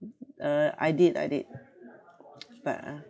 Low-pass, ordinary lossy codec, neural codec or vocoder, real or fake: none; none; none; real